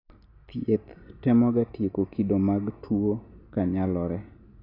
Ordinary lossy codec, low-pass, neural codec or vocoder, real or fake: AAC, 32 kbps; 5.4 kHz; none; real